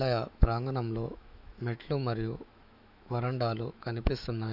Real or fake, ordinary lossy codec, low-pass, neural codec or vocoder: fake; none; 5.4 kHz; autoencoder, 48 kHz, 128 numbers a frame, DAC-VAE, trained on Japanese speech